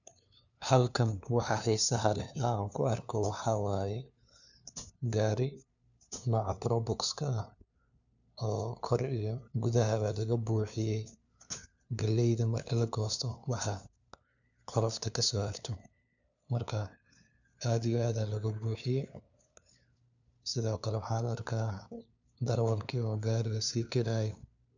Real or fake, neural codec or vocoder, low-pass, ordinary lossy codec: fake; codec, 16 kHz, 2 kbps, FunCodec, trained on LibriTTS, 25 frames a second; 7.2 kHz; none